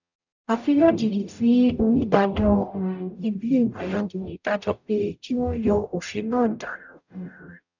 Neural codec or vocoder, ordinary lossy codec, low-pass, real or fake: codec, 44.1 kHz, 0.9 kbps, DAC; none; 7.2 kHz; fake